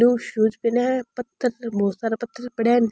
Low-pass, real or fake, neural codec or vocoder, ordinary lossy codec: none; real; none; none